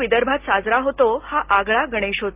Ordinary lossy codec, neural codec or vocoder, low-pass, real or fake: Opus, 24 kbps; none; 3.6 kHz; real